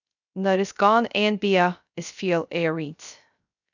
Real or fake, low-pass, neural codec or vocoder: fake; 7.2 kHz; codec, 16 kHz, 0.3 kbps, FocalCodec